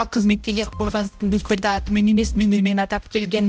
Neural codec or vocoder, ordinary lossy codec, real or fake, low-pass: codec, 16 kHz, 0.5 kbps, X-Codec, HuBERT features, trained on balanced general audio; none; fake; none